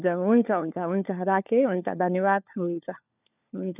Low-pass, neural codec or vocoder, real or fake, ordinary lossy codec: 3.6 kHz; codec, 16 kHz, 4 kbps, FunCodec, trained on LibriTTS, 50 frames a second; fake; none